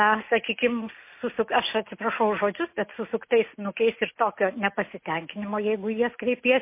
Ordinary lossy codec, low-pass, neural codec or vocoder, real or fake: MP3, 24 kbps; 3.6 kHz; none; real